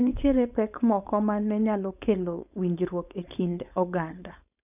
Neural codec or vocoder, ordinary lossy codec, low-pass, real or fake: codec, 16 kHz, 4.8 kbps, FACodec; none; 3.6 kHz; fake